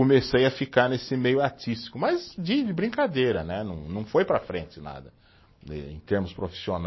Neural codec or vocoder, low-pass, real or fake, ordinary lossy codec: none; 7.2 kHz; real; MP3, 24 kbps